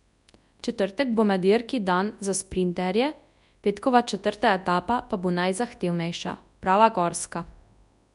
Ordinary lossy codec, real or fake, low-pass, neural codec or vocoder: none; fake; 10.8 kHz; codec, 24 kHz, 0.9 kbps, WavTokenizer, large speech release